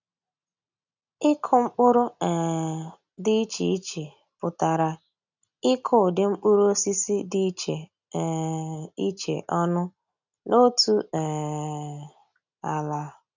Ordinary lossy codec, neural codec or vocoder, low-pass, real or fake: none; none; 7.2 kHz; real